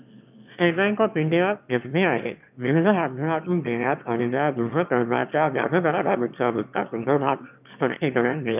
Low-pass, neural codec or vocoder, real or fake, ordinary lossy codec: 3.6 kHz; autoencoder, 22.05 kHz, a latent of 192 numbers a frame, VITS, trained on one speaker; fake; none